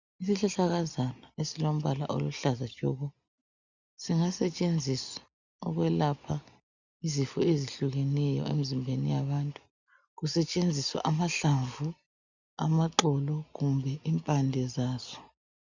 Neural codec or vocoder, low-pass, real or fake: none; 7.2 kHz; real